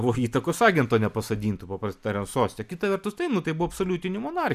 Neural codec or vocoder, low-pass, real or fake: none; 14.4 kHz; real